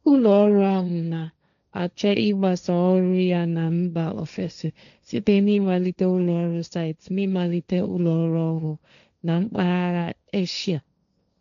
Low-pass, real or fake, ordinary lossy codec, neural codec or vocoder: 7.2 kHz; fake; none; codec, 16 kHz, 1.1 kbps, Voila-Tokenizer